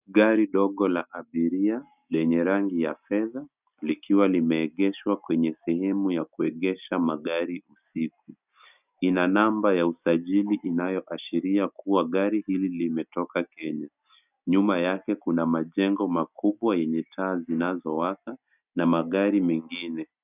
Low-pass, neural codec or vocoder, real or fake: 3.6 kHz; none; real